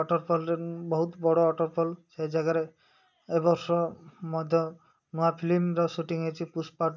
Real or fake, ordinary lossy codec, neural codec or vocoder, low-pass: real; none; none; 7.2 kHz